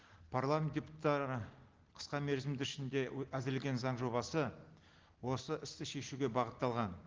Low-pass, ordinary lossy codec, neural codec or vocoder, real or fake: 7.2 kHz; Opus, 16 kbps; none; real